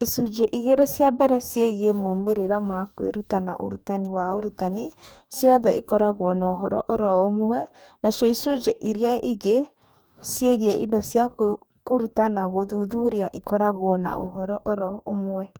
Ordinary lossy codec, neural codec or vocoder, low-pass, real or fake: none; codec, 44.1 kHz, 2.6 kbps, DAC; none; fake